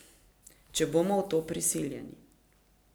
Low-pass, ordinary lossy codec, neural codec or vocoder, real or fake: none; none; none; real